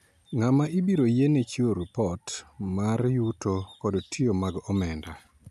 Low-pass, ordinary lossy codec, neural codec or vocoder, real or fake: 14.4 kHz; none; none; real